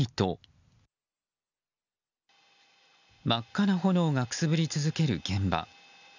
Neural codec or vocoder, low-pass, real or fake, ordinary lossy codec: none; 7.2 kHz; real; none